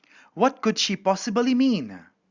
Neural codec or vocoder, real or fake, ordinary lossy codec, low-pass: none; real; Opus, 64 kbps; 7.2 kHz